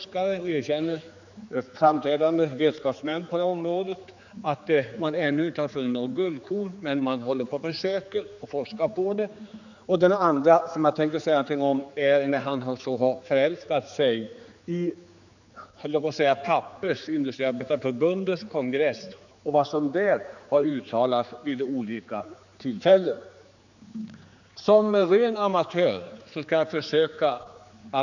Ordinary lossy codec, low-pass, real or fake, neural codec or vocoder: none; 7.2 kHz; fake; codec, 16 kHz, 4 kbps, X-Codec, HuBERT features, trained on general audio